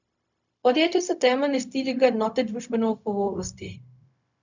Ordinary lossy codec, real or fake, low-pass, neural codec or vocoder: none; fake; 7.2 kHz; codec, 16 kHz, 0.4 kbps, LongCat-Audio-Codec